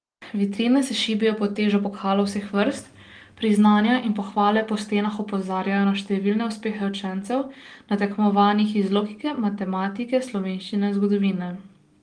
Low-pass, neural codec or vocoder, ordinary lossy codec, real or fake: 9.9 kHz; none; Opus, 24 kbps; real